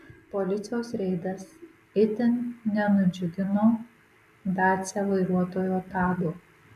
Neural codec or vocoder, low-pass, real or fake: none; 14.4 kHz; real